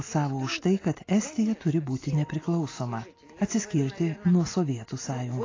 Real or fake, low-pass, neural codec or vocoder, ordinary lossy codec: real; 7.2 kHz; none; AAC, 32 kbps